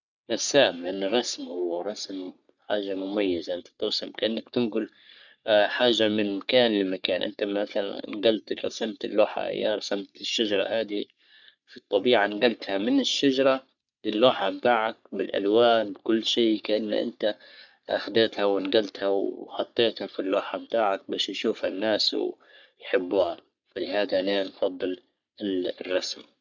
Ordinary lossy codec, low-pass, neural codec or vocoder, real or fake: none; 7.2 kHz; codec, 44.1 kHz, 3.4 kbps, Pupu-Codec; fake